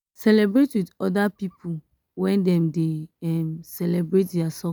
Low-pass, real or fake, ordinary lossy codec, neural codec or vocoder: none; real; none; none